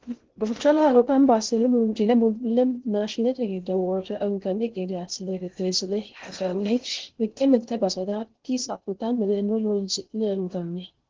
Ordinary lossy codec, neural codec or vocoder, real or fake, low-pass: Opus, 16 kbps; codec, 16 kHz in and 24 kHz out, 0.6 kbps, FocalCodec, streaming, 2048 codes; fake; 7.2 kHz